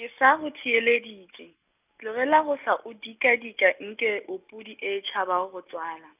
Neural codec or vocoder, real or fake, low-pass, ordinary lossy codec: none; real; 3.6 kHz; none